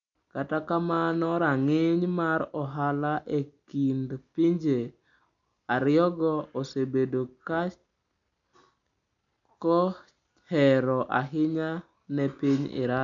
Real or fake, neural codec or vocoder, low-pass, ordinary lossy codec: real; none; 7.2 kHz; none